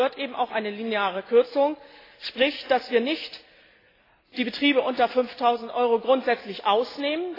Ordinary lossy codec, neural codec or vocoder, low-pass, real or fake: AAC, 24 kbps; none; 5.4 kHz; real